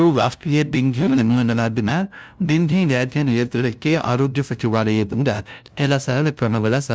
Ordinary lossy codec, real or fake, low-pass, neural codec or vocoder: none; fake; none; codec, 16 kHz, 0.5 kbps, FunCodec, trained on LibriTTS, 25 frames a second